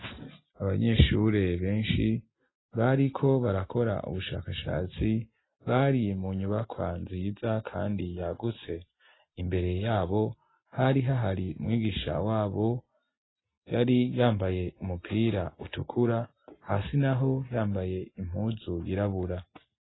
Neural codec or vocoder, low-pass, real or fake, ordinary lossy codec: none; 7.2 kHz; real; AAC, 16 kbps